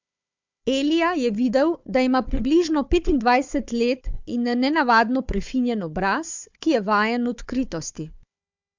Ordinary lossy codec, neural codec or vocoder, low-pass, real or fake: MP3, 64 kbps; codec, 16 kHz, 4 kbps, FunCodec, trained on Chinese and English, 50 frames a second; 7.2 kHz; fake